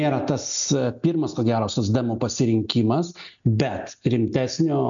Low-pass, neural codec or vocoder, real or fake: 7.2 kHz; none; real